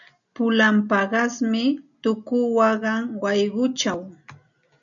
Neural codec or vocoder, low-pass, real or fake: none; 7.2 kHz; real